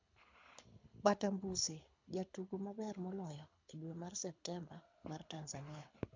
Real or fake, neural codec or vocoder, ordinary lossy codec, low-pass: fake; codec, 24 kHz, 6 kbps, HILCodec; MP3, 64 kbps; 7.2 kHz